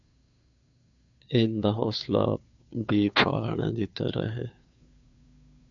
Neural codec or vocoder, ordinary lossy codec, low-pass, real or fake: codec, 16 kHz, 2 kbps, FunCodec, trained on Chinese and English, 25 frames a second; MP3, 96 kbps; 7.2 kHz; fake